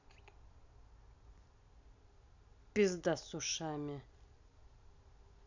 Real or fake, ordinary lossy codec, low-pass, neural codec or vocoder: real; none; 7.2 kHz; none